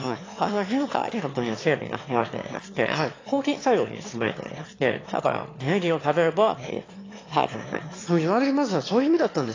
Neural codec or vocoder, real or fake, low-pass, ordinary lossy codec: autoencoder, 22.05 kHz, a latent of 192 numbers a frame, VITS, trained on one speaker; fake; 7.2 kHz; AAC, 32 kbps